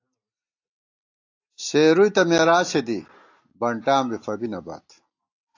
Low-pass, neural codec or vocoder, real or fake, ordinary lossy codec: 7.2 kHz; none; real; AAC, 48 kbps